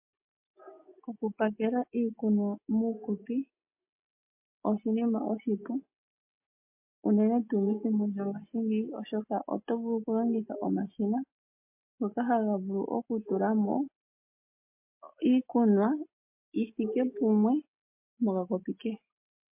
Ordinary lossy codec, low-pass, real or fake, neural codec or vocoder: MP3, 32 kbps; 3.6 kHz; real; none